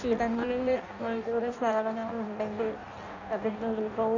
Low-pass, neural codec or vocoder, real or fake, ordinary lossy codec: 7.2 kHz; codec, 16 kHz in and 24 kHz out, 0.6 kbps, FireRedTTS-2 codec; fake; none